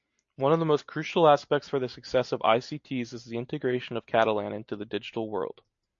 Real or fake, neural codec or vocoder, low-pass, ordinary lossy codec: real; none; 7.2 kHz; MP3, 48 kbps